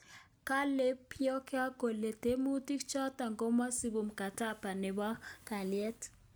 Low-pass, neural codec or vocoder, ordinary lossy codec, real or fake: none; none; none; real